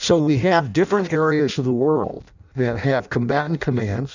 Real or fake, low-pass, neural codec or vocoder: fake; 7.2 kHz; codec, 16 kHz in and 24 kHz out, 0.6 kbps, FireRedTTS-2 codec